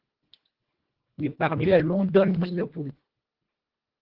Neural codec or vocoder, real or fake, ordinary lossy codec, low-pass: codec, 24 kHz, 1.5 kbps, HILCodec; fake; Opus, 16 kbps; 5.4 kHz